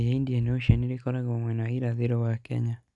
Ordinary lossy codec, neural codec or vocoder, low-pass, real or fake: none; none; 10.8 kHz; real